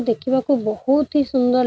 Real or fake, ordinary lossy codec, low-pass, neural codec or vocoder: real; none; none; none